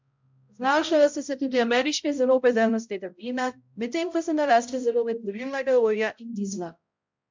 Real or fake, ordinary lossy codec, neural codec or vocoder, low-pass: fake; MP3, 64 kbps; codec, 16 kHz, 0.5 kbps, X-Codec, HuBERT features, trained on balanced general audio; 7.2 kHz